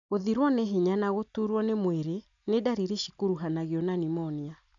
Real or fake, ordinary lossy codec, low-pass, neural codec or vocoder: real; none; 7.2 kHz; none